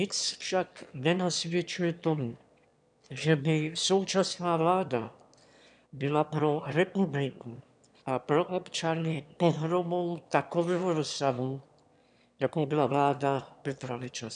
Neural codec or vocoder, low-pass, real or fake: autoencoder, 22.05 kHz, a latent of 192 numbers a frame, VITS, trained on one speaker; 9.9 kHz; fake